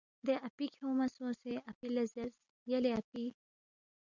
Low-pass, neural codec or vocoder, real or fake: 7.2 kHz; none; real